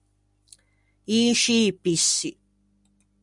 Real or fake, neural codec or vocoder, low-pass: real; none; 10.8 kHz